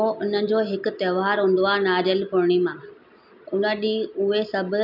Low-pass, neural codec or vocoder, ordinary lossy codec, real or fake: 5.4 kHz; none; none; real